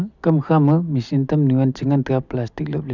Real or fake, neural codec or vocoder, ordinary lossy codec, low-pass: real; none; none; 7.2 kHz